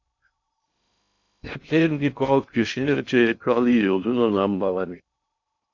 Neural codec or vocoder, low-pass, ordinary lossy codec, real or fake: codec, 16 kHz in and 24 kHz out, 0.6 kbps, FocalCodec, streaming, 2048 codes; 7.2 kHz; MP3, 64 kbps; fake